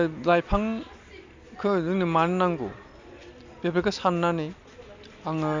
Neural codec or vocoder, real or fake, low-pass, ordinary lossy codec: none; real; 7.2 kHz; MP3, 64 kbps